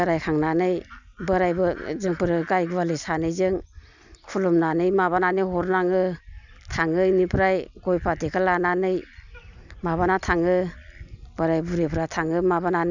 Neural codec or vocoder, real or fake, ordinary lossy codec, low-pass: none; real; none; 7.2 kHz